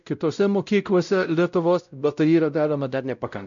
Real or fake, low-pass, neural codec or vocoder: fake; 7.2 kHz; codec, 16 kHz, 0.5 kbps, X-Codec, WavLM features, trained on Multilingual LibriSpeech